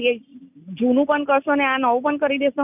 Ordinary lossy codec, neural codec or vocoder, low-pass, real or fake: none; none; 3.6 kHz; real